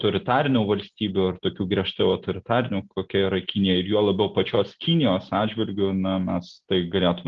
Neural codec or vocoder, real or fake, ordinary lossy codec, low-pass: none; real; Opus, 16 kbps; 7.2 kHz